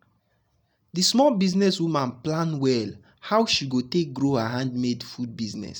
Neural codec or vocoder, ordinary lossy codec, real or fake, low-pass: none; none; real; 19.8 kHz